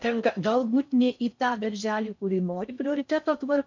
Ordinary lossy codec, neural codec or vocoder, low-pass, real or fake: MP3, 64 kbps; codec, 16 kHz in and 24 kHz out, 0.6 kbps, FocalCodec, streaming, 4096 codes; 7.2 kHz; fake